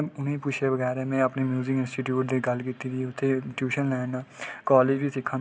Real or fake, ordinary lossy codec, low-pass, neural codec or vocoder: real; none; none; none